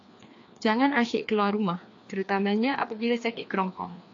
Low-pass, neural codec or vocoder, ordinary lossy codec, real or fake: 7.2 kHz; codec, 16 kHz, 2 kbps, FreqCodec, larger model; AAC, 48 kbps; fake